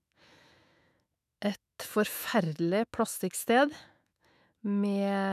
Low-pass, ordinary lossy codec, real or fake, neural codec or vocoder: 14.4 kHz; none; fake; autoencoder, 48 kHz, 128 numbers a frame, DAC-VAE, trained on Japanese speech